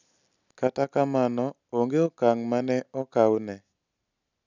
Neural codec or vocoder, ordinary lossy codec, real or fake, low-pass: none; none; real; 7.2 kHz